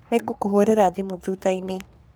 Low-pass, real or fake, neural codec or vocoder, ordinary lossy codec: none; fake; codec, 44.1 kHz, 3.4 kbps, Pupu-Codec; none